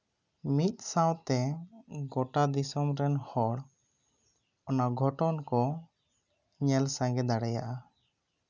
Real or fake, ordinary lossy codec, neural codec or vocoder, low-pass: real; none; none; 7.2 kHz